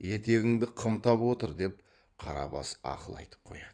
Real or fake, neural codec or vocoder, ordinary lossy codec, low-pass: fake; codec, 16 kHz in and 24 kHz out, 2.2 kbps, FireRedTTS-2 codec; none; 9.9 kHz